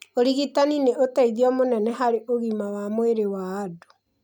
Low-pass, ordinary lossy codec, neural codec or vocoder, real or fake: 19.8 kHz; none; none; real